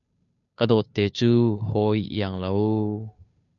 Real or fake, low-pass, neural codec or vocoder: fake; 7.2 kHz; codec, 16 kHz, 2 kbps, FunCodec, trained on Chinese and English, 25 frames a second